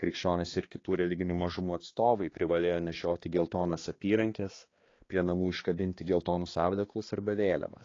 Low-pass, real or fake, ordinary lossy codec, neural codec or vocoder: 7.2 kHz; fake; AAC, 32 kbps; codec, 16 kHz, 2 kbps, X-Codec, HuBERT features, trained on balanced general audio